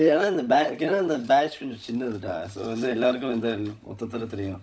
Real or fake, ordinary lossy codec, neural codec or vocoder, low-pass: fake; none; codec, 16 kHz, 16 kbps, FunCodec, trained on LibriTTS, 50 frames a second; none